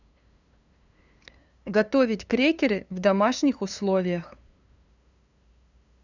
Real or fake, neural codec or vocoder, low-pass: fake; codec, 16 kHz, 2 kbps, FunCodec, trained on LibriTTS, 25 frames a second; 7.2 kHz